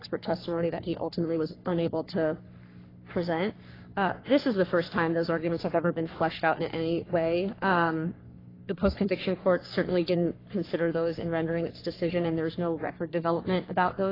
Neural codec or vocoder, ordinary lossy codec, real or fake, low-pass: codec, 44.1 kHz, 3.4 kbps, Pupu-Codec; AAC, 24 kbps; fake; 5.4 kHz